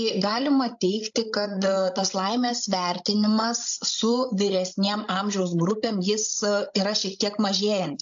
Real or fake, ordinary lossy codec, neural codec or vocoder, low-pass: fake; AAC, 64 kbps; codec, 16 kHz, 16 kbps, FreqCodec, larger model; 7.2 kHz